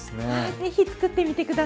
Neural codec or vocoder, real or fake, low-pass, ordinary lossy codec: none; real; none; none